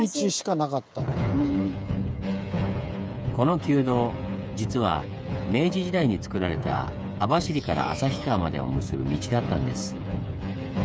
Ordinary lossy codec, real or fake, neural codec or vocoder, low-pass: none; fake; codec, 16 kHz, 8 kbps, FreqCodec, smaller model; none